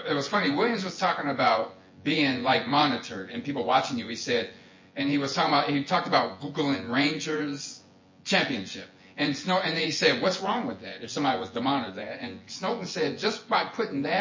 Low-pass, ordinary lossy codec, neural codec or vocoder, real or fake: 7.2 kHz; MP3, 32 kbps; vocoder, 24 kHz, 100 mel bands, Vocos; fake